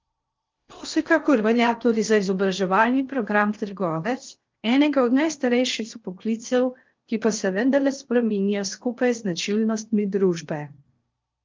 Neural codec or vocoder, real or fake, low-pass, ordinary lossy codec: codec, 16 kHz in and 24 kHz out, 0.6 kbps, FocalCodec, streaming, 4096 codes; fake; 7.2 kHz; Opus, 24 kbps